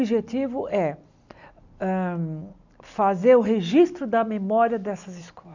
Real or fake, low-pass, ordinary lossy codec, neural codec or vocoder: real; 7.2 kHz; none; none